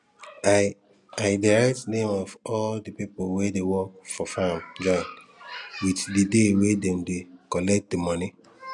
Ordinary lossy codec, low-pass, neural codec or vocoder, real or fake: none; 10.8 kHz; none; real